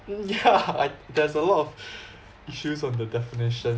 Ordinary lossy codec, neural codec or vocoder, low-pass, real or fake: none; none; none; real